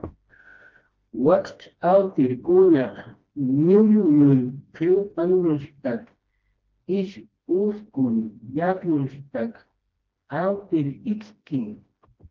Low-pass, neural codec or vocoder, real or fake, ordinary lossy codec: 7.2 kHz; codec, 16 kHz, 1 kbps, FreqCodec, smaller model; fake; Opus, 32 kbps